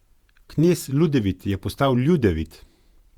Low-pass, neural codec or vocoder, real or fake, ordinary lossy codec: 19.8 kHz; vocoder, 48 kHz, 128 mel bands, Vocos; fake; Opus, 64 kbps